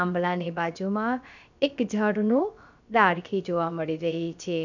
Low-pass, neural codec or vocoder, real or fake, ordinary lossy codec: 7.2 kHz; codec, 16 kHz, about 1 kbps, DyCAST, with the encoder's durations; fake; none